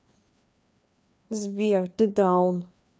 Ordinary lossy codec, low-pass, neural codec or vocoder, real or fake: none; none; codec, 16 kHz, 2 kbps, FreqCodec, larger model; fake